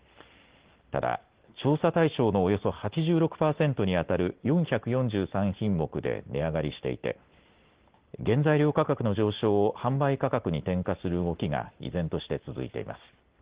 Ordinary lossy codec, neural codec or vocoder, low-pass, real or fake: Opus, 16 kbps; none; 3.6 kHz; real